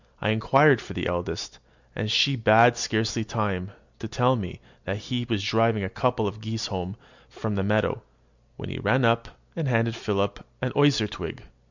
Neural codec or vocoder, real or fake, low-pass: none; real; 7.2 kHz